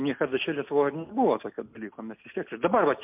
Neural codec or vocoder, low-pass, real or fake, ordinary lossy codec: none; 3.6 kHz; real; MP3, 32 kbps